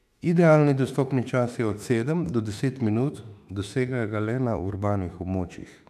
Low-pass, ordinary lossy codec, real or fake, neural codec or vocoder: 14.4 kHz; none; fake; autoencoder, 48 kHz, 32 numbers a frame, DAC-VAE, trained on Japanese speech